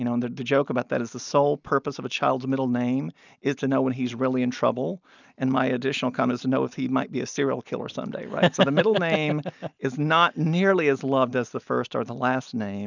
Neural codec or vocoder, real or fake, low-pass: none; real; 7.2 kHz